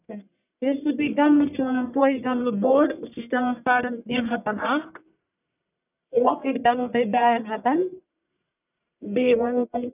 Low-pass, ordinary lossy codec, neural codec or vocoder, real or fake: 3.6 kHz; none; codec, 44.1 kHz, 1.7 kbps, Pupu-Codec; fake